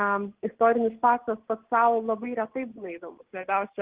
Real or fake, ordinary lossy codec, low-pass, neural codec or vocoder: real; Opus, 16 kbps; 3.6 kHz; none